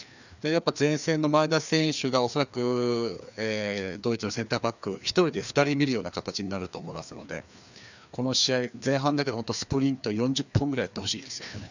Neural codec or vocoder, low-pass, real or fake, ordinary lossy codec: codec, 16 kHz, 2 kbps, FreqCodec, larger model; 7.2 kHz; fake; none